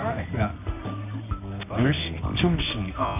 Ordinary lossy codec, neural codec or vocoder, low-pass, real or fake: none; codec, 24 kHz, 0.9 kbps, WavTokenizer, medium music audio release; 3.6 kHz; fake